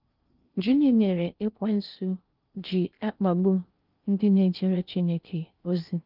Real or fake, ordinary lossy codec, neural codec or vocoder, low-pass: fake; Opus, 24 kbps; codec, 16 kHz in and 24 kHz out, 0.6 kbps, FocalCodec, streaming, 2048 codes; 5.4 kHz